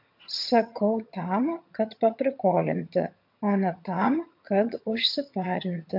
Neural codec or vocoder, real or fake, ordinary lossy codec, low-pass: vocoder, 22.05 kHz, 80 mel bands, HiFi-GAN; fake; MP3, 48 kbps; 5.4 kHz